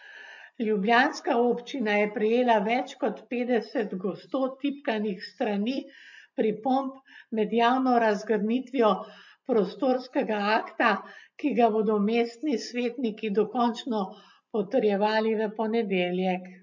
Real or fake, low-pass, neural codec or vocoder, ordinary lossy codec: real; 7.2 kHz; none; MP3, 48 kbps